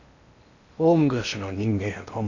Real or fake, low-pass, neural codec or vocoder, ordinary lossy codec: fake; 7.2 kHz; codec, 16 kHz in and 24 kHz out, 0.8 kbps, FocalCodec, streaming, 65536 codes; none